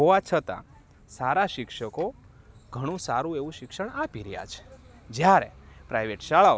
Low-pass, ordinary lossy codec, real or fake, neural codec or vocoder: none; none; real; none